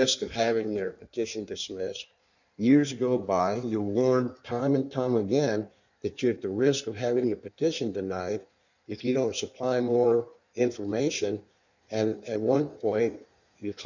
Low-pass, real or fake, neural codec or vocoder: 7.2 kHz; fake; codec, 16 kHz in and 24 kHz out, 1.1 kbps, FireRedTTS-2 codec